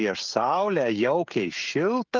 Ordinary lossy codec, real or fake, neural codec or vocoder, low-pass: Opus, 24 kbps; fake; codec, 16 kHz, 16 kbps, FreqCodec, smaller model; 7.2 kHz